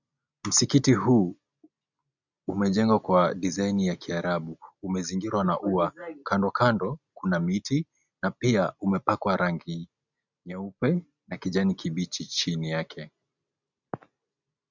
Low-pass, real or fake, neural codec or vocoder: 7.2 kHz; real; none